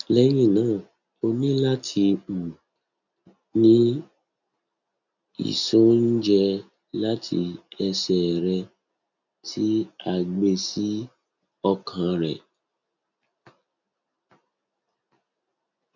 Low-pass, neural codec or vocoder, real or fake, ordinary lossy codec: 7.2 kHz; none; real; none